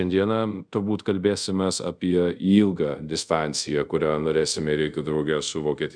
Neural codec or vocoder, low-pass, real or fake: codec, 24 kHz, 0.5 kbps, DualCodec; 9.9 kHz; fake